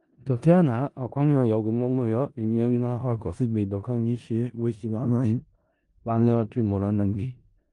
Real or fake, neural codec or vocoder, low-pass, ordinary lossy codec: fake; codec, 16 kHz in and 24 kHz out, 0.4 kbps, LongCat-Audio-Codec, four codebook decoder; 10.8 kHz; Opus, 32 kbps